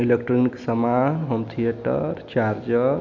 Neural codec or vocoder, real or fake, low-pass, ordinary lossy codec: none; real; 7.2 kHz; MP3, 64 kbps